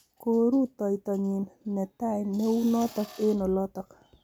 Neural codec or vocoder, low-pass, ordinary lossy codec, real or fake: none; none; none; real